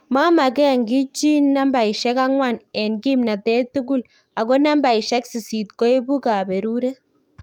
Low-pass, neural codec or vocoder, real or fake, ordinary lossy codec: 19.8 kHz; codec, 44.1 kHz, 7.8 kbps, DAC; fake; none